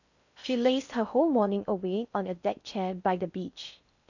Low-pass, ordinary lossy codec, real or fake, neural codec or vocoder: 7.2 kHz; none; fake; codec, 16 kHz in and 24 kHz out, 0.6 kbps, FocalCodec, streaming, 4096 codes